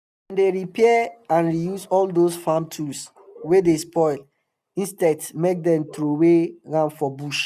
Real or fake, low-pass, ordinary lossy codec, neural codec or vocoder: real; 14.4 kHz; none; none